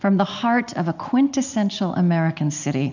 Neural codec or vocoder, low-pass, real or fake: none; 7.2 kHz; real